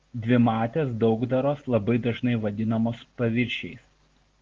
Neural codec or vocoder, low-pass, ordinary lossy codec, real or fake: none; 7.2 kHz; Opus, 16 kbps; real